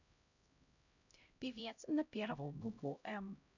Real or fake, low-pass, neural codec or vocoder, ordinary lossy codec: fake; 7.2 kHz; codec, 16 kHz, 0.5 kbps, X-Codec, HuBERT features, trained on LibriSpeech; none